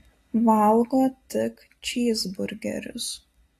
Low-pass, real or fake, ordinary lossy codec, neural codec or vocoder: 14.4 kHz; real; AAC, 48 kbps; none